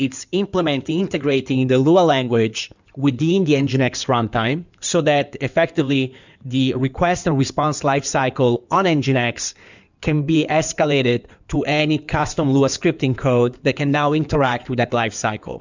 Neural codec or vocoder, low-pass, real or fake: codec, 16 kHz in and 24 kHz out, 2.2 kbps, FireRedTTS-2 codec; 7.2 kHz; fake